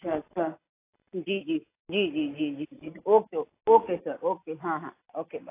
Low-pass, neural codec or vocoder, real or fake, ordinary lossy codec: 3.6 kHz; none; real; AAC, 24 kbps